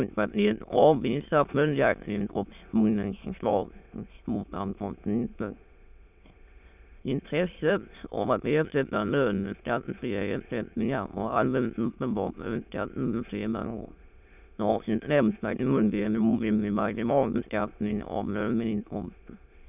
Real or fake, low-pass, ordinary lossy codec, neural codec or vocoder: fake; 3.6 kHz; none; autoencoder, 22.05 kHz, a latent of 192 numbers a frame, VITS, trained on many speakers